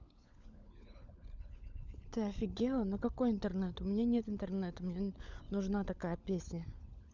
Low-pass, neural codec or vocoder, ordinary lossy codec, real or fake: 7.2 kHz; codec, 16 kHz, 16 kbps, FunCodec, trained on LibriTTS, 50 frames a second; none; fake